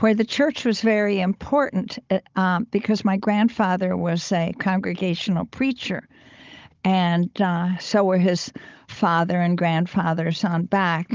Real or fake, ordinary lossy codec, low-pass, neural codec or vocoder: fake; Opus, 24 kbps; 7.2 kHz; codec, 16 kHz, 16 kbps, FunCodec, trained on Chinese and English, 50 frames a second